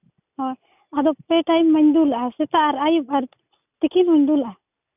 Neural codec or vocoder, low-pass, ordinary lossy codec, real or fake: none; 3.6 kHz; none; real